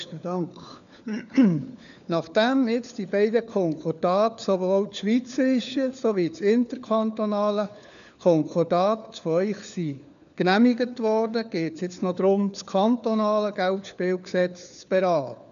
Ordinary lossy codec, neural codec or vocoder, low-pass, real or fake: none; codec, 16 kHz, 4 kbps, FunCodec, trained on LibriTTS, 50 frames a second; 7.2 kHz; fake